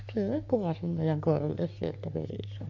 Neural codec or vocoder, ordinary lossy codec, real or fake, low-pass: codec, 44.1 kHz, 3.4 kbps, Pupu-Codec; AAC, 48 kbps; fake; 7.2 kHz